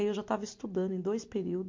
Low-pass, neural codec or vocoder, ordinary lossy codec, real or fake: 7.2 kHz; none; MP3, 48 kbps; real